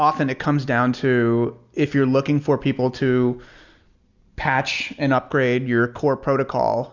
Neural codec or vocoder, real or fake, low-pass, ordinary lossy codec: none; real; 7.2 kHz; Opus, 64 kbps